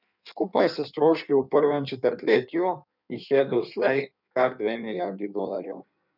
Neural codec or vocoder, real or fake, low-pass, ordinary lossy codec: codec, 16 kHz in and 24 kHz out, 1.1 kbps, FireRedTTS-2 codec; fake; 5.4 kHz; none